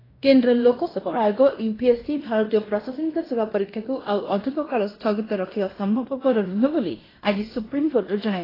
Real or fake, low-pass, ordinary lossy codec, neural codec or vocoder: fake; 5.4 kHz; AAC, 24 kbps; codec, 16 kHz in and 24 kHz out, 0.9 kbps, LongCat-Audio-Codec, fine tuned four codebook decoder